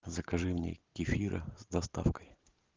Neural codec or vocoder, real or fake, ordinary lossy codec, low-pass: vocoder, 44.1 kHz, 128 mel bands every 512 samples, BigVGAN v2; fake; Opus, 24 kbps; 7.2 kHz